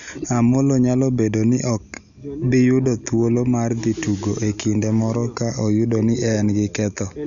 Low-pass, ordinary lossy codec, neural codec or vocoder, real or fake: 7.2 kHz; none; none; real